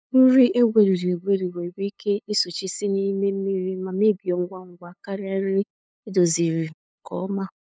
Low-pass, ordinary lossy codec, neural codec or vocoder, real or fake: none; none; codec, 16 kHz, 8 kbps, FunCodec, trained on LibriTTS, 25 frames a second; fake